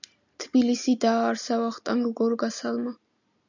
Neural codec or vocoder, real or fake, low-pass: none; real; 7.2 kHz